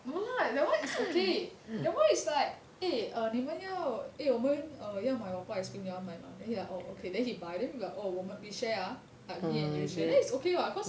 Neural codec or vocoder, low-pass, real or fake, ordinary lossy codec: none; none; real; none